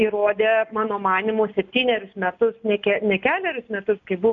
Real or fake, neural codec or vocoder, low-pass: real; none; 7.2 kHz